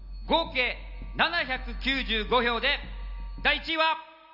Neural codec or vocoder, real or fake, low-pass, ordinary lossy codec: none; real; 5.4 kHz; AAC, 48 kbps